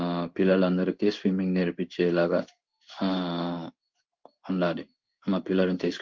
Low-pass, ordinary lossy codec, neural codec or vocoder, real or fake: 7.2 kHz; Opus, 32 kbps; codec, 16 kHz in and 24 kHz out, 1 kbps, XY-Tokenizer; fake